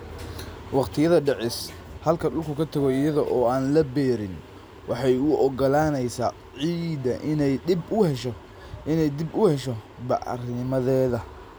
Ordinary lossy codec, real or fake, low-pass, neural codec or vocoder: none; real; none; none